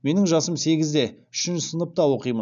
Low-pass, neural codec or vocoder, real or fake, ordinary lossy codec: 7.2 kHz; none; real; none